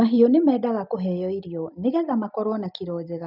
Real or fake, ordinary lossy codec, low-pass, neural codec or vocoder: real; none; 5.4 kHz; none